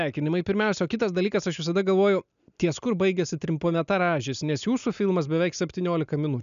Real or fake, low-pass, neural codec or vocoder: real; 7.2 kHz; none